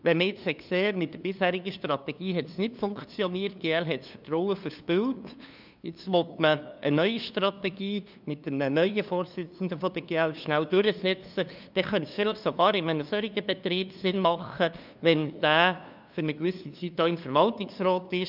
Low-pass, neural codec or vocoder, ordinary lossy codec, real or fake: 5.4 kHz; codec, 16 kHz, 2 kbps, FunCodec, trained on LibriTTS, 25 frames a second; none; fake